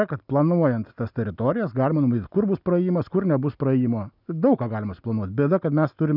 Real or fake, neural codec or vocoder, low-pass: real; none; 5.4 kHz